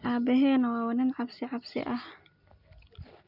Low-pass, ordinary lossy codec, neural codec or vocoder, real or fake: 5.4 kHz; none; none; real